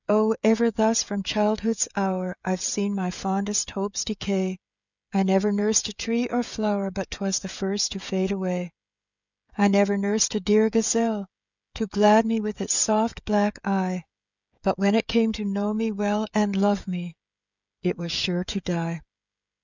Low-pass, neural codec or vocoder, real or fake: 7.2 kHz; codec, 16 kHz, 16 kbps, FreqCodec, smaller model; fake